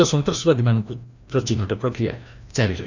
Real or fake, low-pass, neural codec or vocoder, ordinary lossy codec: fake; 7.2 kHz; codec, 16 kHz, 1 kbps, FreqCodec, larger model; none